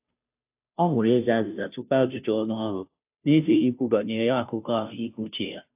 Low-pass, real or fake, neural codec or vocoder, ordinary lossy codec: 3.6 kHz; fake; codec, 16 kHz, 0.5 kbps, FunCodec, trained on Chinese and English, 25 frames a second; AAC, 32 kbps